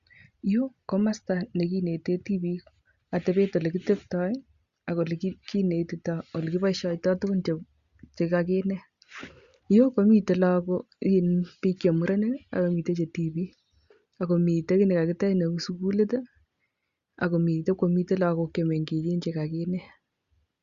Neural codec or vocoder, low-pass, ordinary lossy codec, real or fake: none; 7.2 kHz; Opus, 64 kbps; real